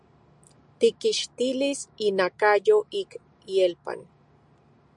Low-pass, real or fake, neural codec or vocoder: 10.8 kHz; real; none